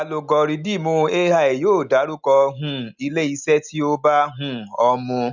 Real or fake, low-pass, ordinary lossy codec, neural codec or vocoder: real; 7.2 kHz; none; none